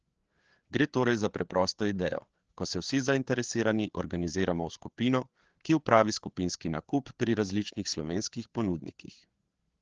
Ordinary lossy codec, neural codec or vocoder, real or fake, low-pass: Opus, 16 kbps; codec, 16 kHz, 4 kbps, FreqCodec, larger model; fake; 7.2 kHz